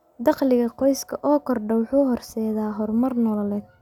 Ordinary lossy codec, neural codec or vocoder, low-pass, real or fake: none; none; 19.8 kHz; real